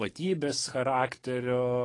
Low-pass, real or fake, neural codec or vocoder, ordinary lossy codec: 10.8 kHz; fake; codec, 44.1 kHz, 7.8 kbps, DAC; AAC, 32 kbps